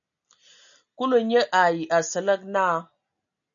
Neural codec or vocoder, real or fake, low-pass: none; real; 7.2 kHz